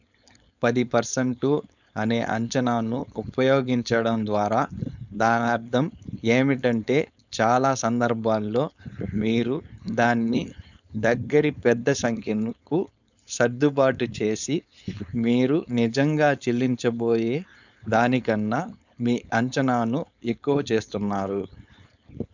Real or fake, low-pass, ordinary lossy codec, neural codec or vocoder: fake; 7.2 kHz; none; codec, 16 kHz, 4.8 kbps, FACodec